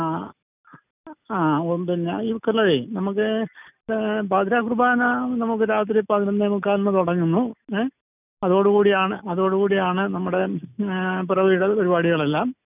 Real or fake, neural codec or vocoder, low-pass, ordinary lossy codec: real; none; 3.6 kHz; none